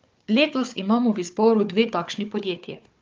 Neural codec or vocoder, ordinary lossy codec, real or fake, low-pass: codec, 16 kHz, 4 kbps, FunCodec, trained on Chinese and English, 50 frames a second; Opus, 24 kbps; fake; 7.2 kHz